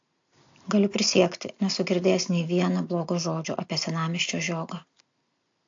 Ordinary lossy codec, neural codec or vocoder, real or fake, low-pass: AAC, 48 kbps; none; real; 7.2 kHz